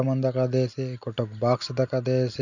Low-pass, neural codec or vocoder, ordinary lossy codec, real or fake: 7.2 kHz; none; none; real